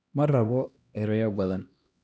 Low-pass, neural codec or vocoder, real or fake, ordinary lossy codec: none; codec, 16 kHz, 1 kbps, X-Codec, HuBERT features, trained on LibriSpeech; fake; none